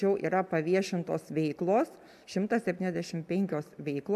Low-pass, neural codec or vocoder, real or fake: 14.4 kHz; none; real